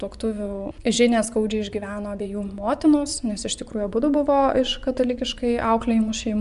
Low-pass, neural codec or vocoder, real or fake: 10.8 kHz; vocoder, 24 kHz, 100 mel bands, Vocos; fake